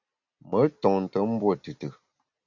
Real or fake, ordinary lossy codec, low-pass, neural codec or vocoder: real; Opus, 64 kbps; 7.2 kHz; none